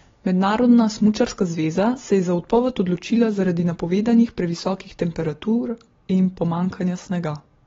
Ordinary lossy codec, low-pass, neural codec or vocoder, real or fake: AAC, 24 kbps; 7.2 kHz; none; real